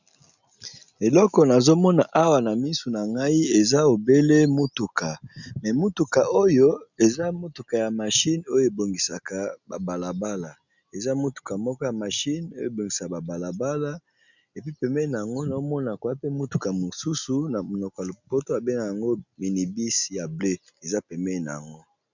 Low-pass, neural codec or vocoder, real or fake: 7.2 kHz; none; real